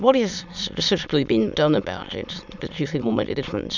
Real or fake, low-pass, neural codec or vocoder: fake; 7.2 kHz; autoencoder, 22.05 kHz, a latent of 192 numbers a frame, VITS, trained on many speakers